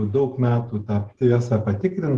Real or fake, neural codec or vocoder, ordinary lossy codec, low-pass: real; none; Opus, 16 kbps; 10.8 kHz